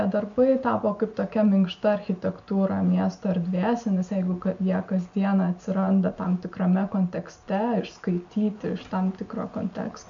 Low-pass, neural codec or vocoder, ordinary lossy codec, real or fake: 7.2 kHz; none; MP3, 64 kbps; real